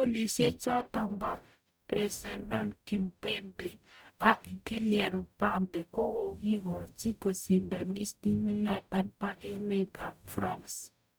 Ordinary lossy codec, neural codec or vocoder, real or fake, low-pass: none; codec, 44.1 kHz, 0.9 kbps, DAC; fake; none